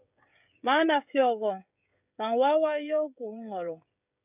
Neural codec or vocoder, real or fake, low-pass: codec, 16 kHz, 16 kbps, FreqCodec, smaller model; fake; 3.6 kHz